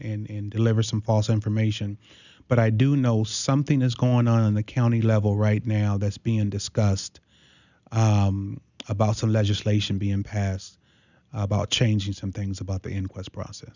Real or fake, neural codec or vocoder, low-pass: real; none; 7.2 kHz